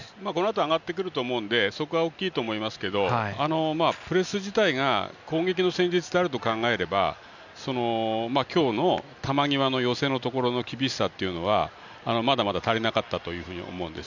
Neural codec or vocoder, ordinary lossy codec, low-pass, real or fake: none; none; 7.2 kHz; real